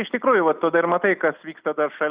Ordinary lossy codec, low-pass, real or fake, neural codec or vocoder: Opus, 64 kbps; 3.6 kHz; real; none